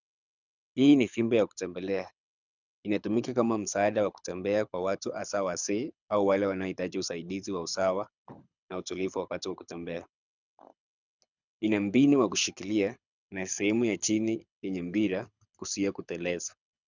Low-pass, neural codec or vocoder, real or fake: 7.2 kHz; codec, 24 kHz, 6 kbps, HILCodec; fake